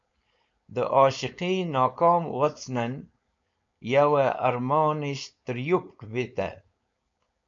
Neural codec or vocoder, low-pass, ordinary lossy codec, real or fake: codec, 16 kHz, 4.8 kbps, FACodec; 7.2 kHz; MP3, 48 kbps; fake